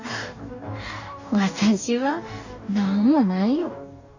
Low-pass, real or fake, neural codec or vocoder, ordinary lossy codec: 7.2 kHz; fake; codec, 44.1 kHz, 2.6 kbps, DAC; none